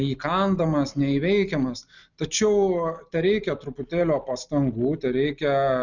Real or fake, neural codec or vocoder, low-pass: real; none; 7.2 kHz